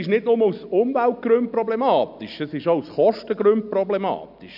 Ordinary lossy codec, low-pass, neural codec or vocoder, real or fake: none; 5.4 kHz; none; real